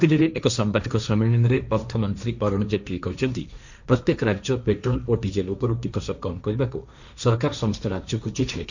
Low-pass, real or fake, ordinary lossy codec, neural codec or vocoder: 7.2 kHz; fake; none; codec, 16 kHz, 1.1 kbps, Voila-Tokenizer